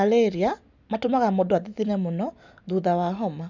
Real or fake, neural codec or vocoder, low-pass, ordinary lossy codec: real; none; 7.2 kHz; none